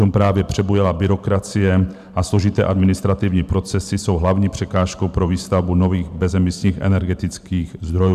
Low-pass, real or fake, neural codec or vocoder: 14.4 kHz; fake; vocoder, 44.1 kHz, 128 mel bands every 512 samples, BigVGAN v2